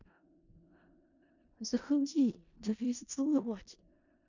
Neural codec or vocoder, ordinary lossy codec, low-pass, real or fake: codec, 16 kHz in and 24 kHz out, 0.4 kbps, LongCat-Audio-Codec, four codebook decoder; none; 7.2 kHz; fake